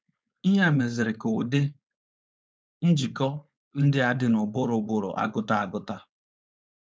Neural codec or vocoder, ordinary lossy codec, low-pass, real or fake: codec, 16 kHz, 4.8 kbps, FACodec; none; none; fake